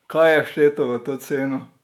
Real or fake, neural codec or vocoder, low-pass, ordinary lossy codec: fake; autoencoder, 48 kHz, 128 numbers a frame, DAC-VAE, trained on Japanese speech; 19.8 kHz; none